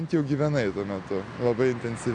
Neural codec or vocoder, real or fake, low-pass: none; real; 9.9 kHz